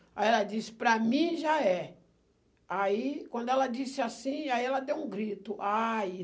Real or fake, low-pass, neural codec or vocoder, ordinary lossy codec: real; none; none; none